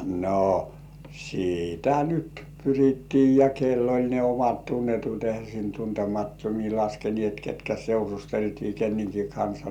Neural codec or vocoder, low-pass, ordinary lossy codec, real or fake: none; 19.8 kHz; none; real